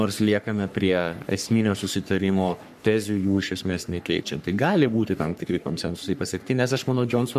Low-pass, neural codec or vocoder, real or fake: 14.4 kHz; codec, 44.1 kHz, 3.4 kbps, Pupu-Codec; fake